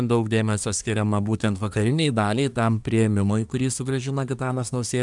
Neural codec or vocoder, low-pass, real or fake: codec, 24 kHz, 1 kbps, SNAC; 10.8 kHz; fake